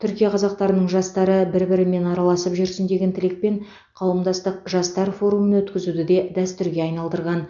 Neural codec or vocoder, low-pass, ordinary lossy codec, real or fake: none; 7.2 kHz; none; real